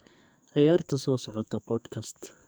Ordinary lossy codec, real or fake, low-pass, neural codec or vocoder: none; fake; none; codec, 44.1 kHz, 2.6 kbps, SNAC